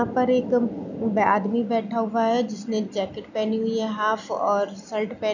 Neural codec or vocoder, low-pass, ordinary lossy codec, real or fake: none; 7.2 kHz; none; real